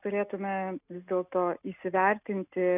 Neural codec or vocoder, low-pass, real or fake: none; 3.6 kHz; real